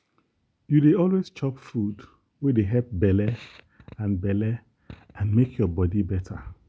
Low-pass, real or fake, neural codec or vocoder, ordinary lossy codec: none; real; none; none